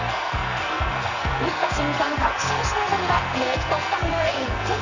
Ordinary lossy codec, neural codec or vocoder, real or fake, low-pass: none; codec, 44.1 kHz, 2.6 kbps, SNAC; fake; 7.2 kHz